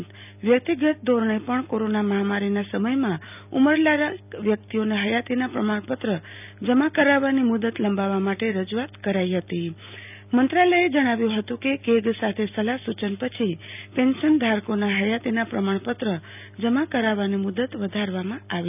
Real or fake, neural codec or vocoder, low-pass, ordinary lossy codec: real; none; 3.6 kHz; none